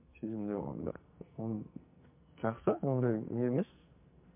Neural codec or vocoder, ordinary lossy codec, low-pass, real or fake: codec, 44.1 kHz, 2.6 kbps, SNAC; MP3, 32 kbps; 3.6 kHz; fake